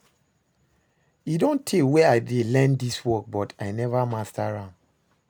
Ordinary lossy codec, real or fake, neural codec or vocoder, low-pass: none; real; none; none